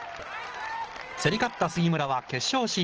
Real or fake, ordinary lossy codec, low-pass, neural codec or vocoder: real; Opus, 16 kbps; 7.2 kHz; none